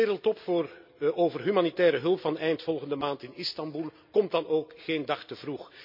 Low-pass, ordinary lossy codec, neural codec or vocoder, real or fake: 5.4 kHz; MP3, 48 kbps; none; real